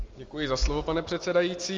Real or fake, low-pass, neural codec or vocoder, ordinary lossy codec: real; 7.2 kHz; none; Opus, 32 kbps